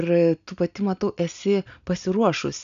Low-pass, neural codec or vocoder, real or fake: 7.2 kHz; none; real